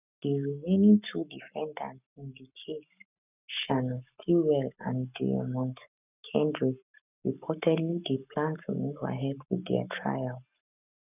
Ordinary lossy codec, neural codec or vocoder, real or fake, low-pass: none; codec, 44.1 kHz, 7.8 kbps, Pupu-Codec; fake; 3.6 kHz